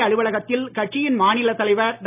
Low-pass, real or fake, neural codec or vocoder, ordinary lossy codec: 3.6 kHz; real; none; none